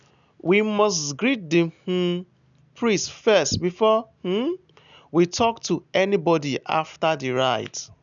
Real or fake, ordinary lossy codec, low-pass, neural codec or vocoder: real; none; 7.2 kHz; none